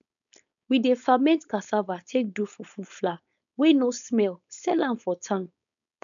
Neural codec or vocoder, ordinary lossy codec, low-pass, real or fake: codec, 16 kHz, 4.8 kbps, FACodec; none; 7.2 kHz; fake